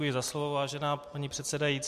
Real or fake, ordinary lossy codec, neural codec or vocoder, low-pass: real; MP3, 64 kbps; none; 14.4 kHz